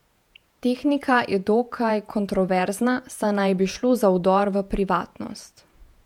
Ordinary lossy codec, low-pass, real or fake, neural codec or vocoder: MP3, 96 kbps; 19.8 kHz; fake; vocoder, 48 kHz, 128 mel bands, Vocos